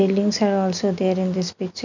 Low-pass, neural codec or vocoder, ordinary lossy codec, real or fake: 7.2 kHz; none; none; real